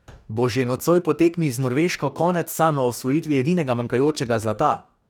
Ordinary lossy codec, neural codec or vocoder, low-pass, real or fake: none; codec, 44.1 kHz, 2.6 kbps, DAC; 19.8 kHz; fake